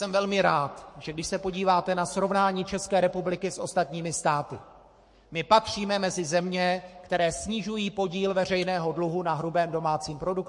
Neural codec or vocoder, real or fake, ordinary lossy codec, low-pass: codec, 44.1 kHz, 7.8 kbps, Pupu-Codec; fake; MP3, 48 kbps; 10.8 kHz